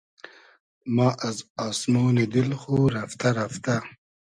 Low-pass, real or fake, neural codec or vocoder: 9.9 kHz; real; none